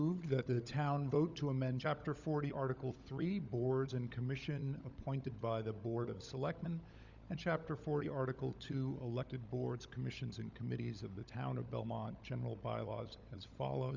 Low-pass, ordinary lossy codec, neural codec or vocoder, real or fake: 7.2 kHz; Opus, 64 kbps; codec, 16 kHz, 16 kbps, FunCodec, trained on LibriTTS, 50 frames a second; fake